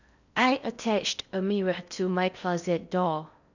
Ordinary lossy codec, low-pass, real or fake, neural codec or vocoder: none; 7.2 kHz; fake; codec, 16 kHz in and 24 kHz out, 0.6 kbps, FocalCodec, streaming, 4096 codes